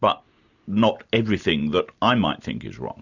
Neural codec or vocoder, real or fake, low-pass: none; real; 7.2 kHz